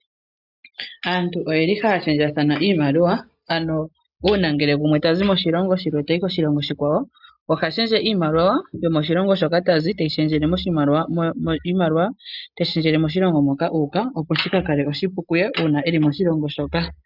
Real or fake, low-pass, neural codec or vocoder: real; 5.4 kHz; none